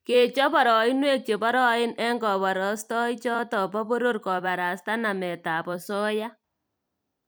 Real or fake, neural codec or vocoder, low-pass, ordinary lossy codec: fake; vocoder, 44.1 kHz, 128 mel bands every 256 samples, BigVGAN v2; none; none